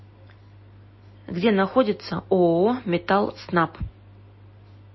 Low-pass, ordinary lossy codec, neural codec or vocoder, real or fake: 7.2 kHz; MP3, 24 kbps; none; real